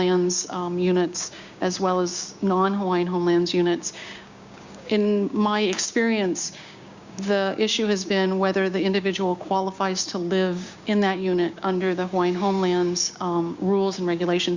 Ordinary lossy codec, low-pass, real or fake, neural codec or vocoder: Opus, 64 kbps; 7.2 kHz; fake; codec, 16 kHz, 6 kbps, DAC